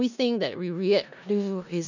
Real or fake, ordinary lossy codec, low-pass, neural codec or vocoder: fake; none; 7.2 kHz; codec, 16 kHz in and 24 kHz out, 0.4 kbps, LongCat-Audio-Codec, four codebook decoder